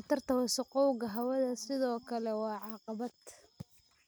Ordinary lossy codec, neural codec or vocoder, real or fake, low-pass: none; none; real; none